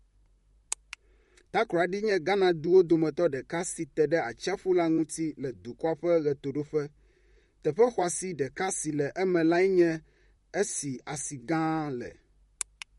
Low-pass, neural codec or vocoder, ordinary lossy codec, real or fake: 19.8 kHz; vocoder, 44.1 kHz, 128 mel bands, Pupu-Vocoder; MP3, 48 kbps; fake